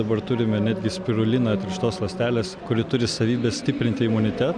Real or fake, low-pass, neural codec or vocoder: real; 9.9 kHz; none